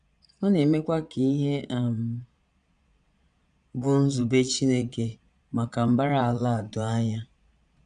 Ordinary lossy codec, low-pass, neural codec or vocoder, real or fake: none; 9.9 kHz; vocoder, 22.05 kHz, 80 mel bands, Vocos; fake